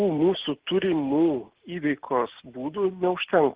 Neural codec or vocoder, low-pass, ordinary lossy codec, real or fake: none; 3.6 kHz; Opus, 16 kbps; real